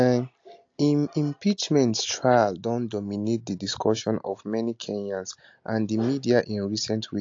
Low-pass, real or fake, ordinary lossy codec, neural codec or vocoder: 7.2 kHz; real; AAC, 48 kbps; none